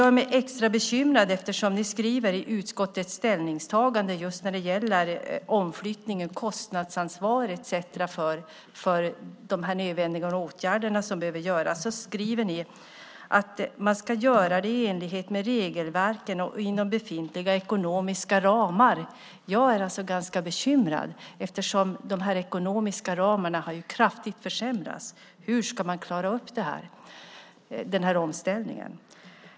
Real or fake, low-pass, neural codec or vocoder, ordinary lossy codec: real; none; none; none